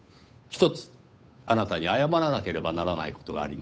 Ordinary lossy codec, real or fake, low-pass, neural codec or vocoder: none; fake; none; codec, 16 kHz, 8 kbps, FunCodec, trained on Chinese and English, 25 frames a second